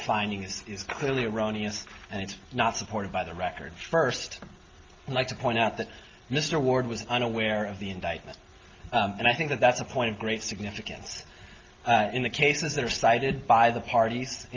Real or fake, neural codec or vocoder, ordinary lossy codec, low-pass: real; none; Opus, 32 kbps; 7.2 kHz